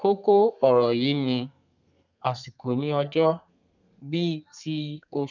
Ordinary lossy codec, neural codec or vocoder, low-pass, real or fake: none; codec, 32 kHz, 1.9 kbps, SNAC; 7.2 kHz; fake